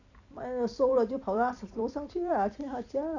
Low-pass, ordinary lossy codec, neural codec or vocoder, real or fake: 7.2 kHz; none; vocoder, 44.1 kHz, 128 mel bands every 256 samples, BigVGAN v2; fake